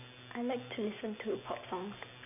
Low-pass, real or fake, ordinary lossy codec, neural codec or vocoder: 3.6 kHz; real; none; none